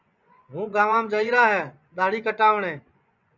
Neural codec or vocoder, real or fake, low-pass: vocoder, 24 kHz, 100 mel bands, Vocos; fake; 7.2 kHz